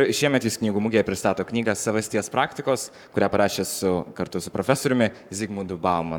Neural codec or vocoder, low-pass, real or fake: codec, 44.1 kHz, 7.8 kbps, Pupu-Codec; 19.8 kHz; fake